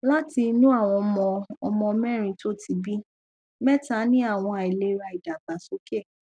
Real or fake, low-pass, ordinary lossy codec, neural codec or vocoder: real; 9.9 kHz; none; none